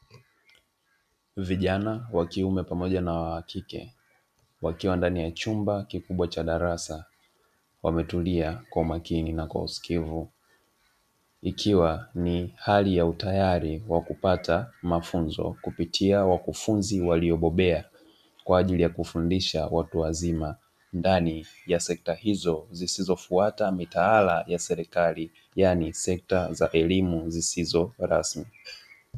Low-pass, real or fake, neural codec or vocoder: 14.4 kHz; real; none